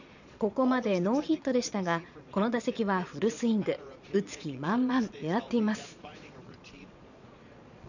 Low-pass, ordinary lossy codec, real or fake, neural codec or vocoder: 7.2 kHz; none; fake; vocoder, 22.05 kHz, 80 mel bands, Vocos